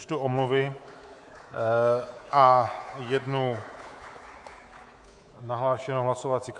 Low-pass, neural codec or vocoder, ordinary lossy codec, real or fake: 10.8 kHz; codec, 24 kHz, 3.1 kbps, DualCodec; AAC, 64 kbps; fake